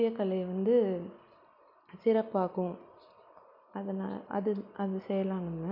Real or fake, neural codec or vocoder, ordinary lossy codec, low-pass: real; none; none; 5.4 kHz